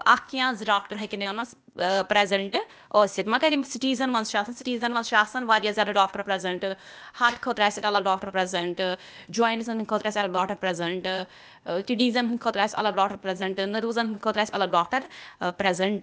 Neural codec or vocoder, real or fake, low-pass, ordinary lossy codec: codec, 16 kHz, 0.8 kbps, ZipCodec; fake; none; none